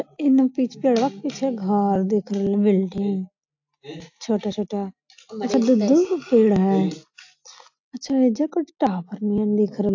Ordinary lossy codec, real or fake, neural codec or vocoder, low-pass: AAC, 48 kbps; real; none; 7.2 kHz